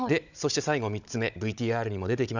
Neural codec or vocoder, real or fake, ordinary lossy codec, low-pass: codec, 16 kHz, 16 kbps, FunCodec, trained on Chinese and English, 50 frames a second; fake; none; 7.2 kHz